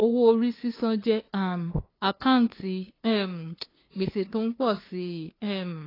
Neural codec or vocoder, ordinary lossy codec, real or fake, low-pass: codec, 16 kHz, 2 kbps, FunCodec, trained on LibriTTS, 25 frames a second; AAC, 24 kbps; fake; 5.4 kHz